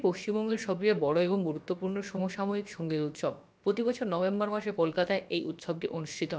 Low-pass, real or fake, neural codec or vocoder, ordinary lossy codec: none; fake; codec, 16 kHz, about 1 kbps, DyCAST, with the encoder's durations; none